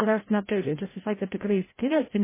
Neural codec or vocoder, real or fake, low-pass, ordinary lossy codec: codec, 16 kHz, 0.5 kbps, FreqCodec, larger model; fake; 3.6 kHz; MP3, 16 kbps